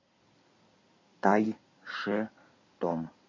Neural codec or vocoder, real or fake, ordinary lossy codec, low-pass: codec, 16 kHz in and 24 kHz out, 2.2 kbps, FireRedTTS-2 codec; fake; MP3, 32 kbps; 7.2 kHz